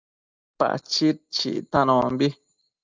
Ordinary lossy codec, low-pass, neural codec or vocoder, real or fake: Opus, 24 kbps; 7.2 kHz; none; real